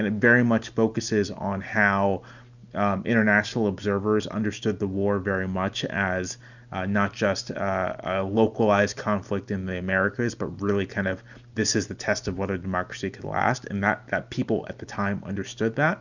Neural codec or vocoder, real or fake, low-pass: none; real; 7.2 kHz